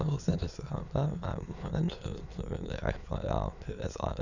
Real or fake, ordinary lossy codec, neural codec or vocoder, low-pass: fake; none; autoencoder, 22.05 kHz, a latent of 192 numbers a frame, VITS, trained on many speakers; 7.2 kHz